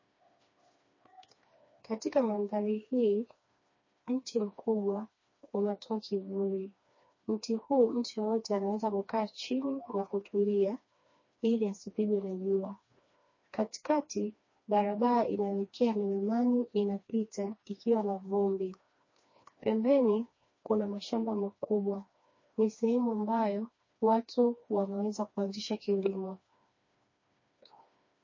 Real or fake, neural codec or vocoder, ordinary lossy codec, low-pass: fake; codec, 16 kHz, 2 kbps, FreqCodec, smaller model; MP3, 32 kbps; 7.2 kHz